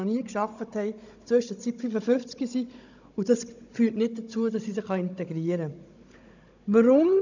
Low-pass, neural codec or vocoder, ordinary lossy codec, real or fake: 7.2 kHz; codec, 16 kHz, 16 kbps, FunCodec, trained on Chinese and English, 50 frames a second; none; fake